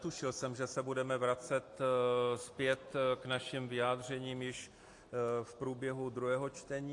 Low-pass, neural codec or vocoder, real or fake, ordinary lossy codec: 10.8 kHz; none; real; AAC, 48 kbps